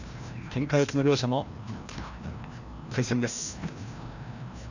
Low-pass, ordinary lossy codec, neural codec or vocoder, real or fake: 7.2 kHz; none; codec, 16 kHz, 1 kbps, FreqCodec, larger model; fake